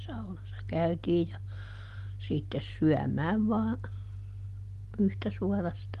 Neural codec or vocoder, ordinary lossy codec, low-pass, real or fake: none; Opus, 24 kbps; 14.4 kHz; real